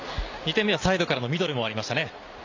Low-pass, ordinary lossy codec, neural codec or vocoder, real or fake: 7.2 kHz; AAC, 48 kbps; none; real